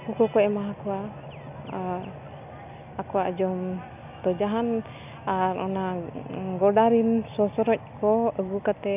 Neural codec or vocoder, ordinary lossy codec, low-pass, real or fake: none; none; 3.6 kHz; real